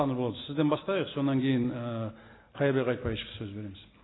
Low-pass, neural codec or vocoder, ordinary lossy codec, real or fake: 7.2 kHz; none; AAC, 16 kbps; real